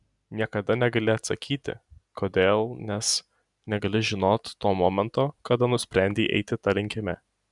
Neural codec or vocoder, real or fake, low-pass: none; real; 10.8 kHz